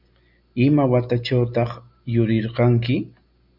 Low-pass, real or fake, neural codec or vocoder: 5.4 kHz; real; none